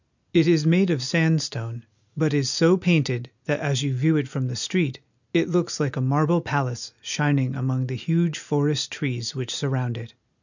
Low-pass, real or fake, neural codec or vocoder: 7.2 kHz; real; none